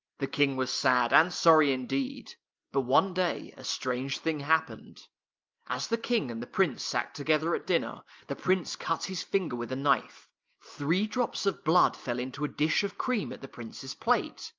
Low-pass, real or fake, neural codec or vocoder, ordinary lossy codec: 7.2 kHz; real; none; Opus, 24 kbps